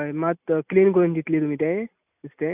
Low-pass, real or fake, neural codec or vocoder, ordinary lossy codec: 3.6 kHz; real; none; none